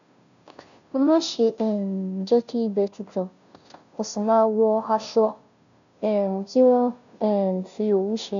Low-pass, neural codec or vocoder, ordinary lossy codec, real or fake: 7.2 kHz; codec, 16 kHz, 0.5 kbps, FunCodec, trained on Chinese and English, 25 frames a second; none; fake